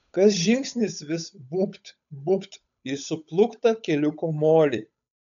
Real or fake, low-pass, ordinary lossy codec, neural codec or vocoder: fake; 7.2 kHz; MP3, 96 kbps; codec, 16 kHz, 8 kbps, FunCodec, trained on Chinese and English, 25 frames a second